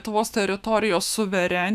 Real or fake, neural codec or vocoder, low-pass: fake; autoencoder, 48 kHz, 128 numbers a frame, DAC-VAE, trained on Japanese speech; 14.4 kHz